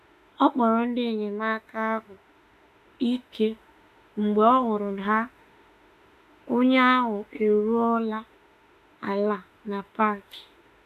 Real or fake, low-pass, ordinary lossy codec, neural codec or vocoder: fake; 14.4 kHz; none; autoencoder, 48 kHz, 32 numbers a frame, DAC-VAE, trained on Japanese speech